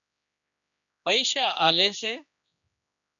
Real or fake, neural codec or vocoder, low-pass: fake; codec, 16 kHz, 2 kbps, X-Codec, HuBERT features, trained on general audio; 7.2 kHz